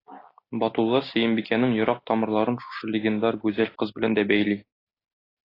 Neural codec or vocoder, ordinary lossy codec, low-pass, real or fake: none; AAC, 24 kbps; 5.4 kHz; real